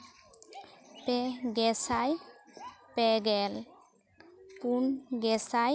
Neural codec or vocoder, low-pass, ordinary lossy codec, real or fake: none; none; none; real